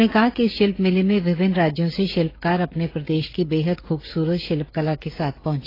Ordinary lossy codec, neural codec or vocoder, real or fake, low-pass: AAC, 24 kbps; codec, 16 kHz, 16 kbps, FreqCodec, smaller model; fake; 5.4 kHz